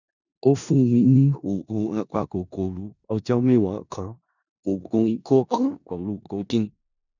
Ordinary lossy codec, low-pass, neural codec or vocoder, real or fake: none; 7.2 kHz; codec, 16 kHz in and 24 kHz out, 0.4 kbps, LongCat-Audio-Codec, four codebook decoder; fake